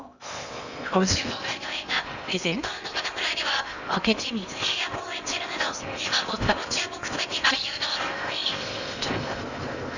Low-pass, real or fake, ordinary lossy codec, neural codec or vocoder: 7.2 kHz; fake; none; codec, 16 kHz in and 24 kHz out, 0.8 kbps, FocalCodec, streaming, 65536 codes